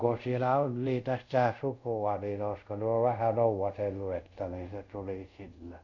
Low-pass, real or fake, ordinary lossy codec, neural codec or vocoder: 7.2 kHz; fake; none; codec, 24 kHz, 0.5 kbps, DualCodec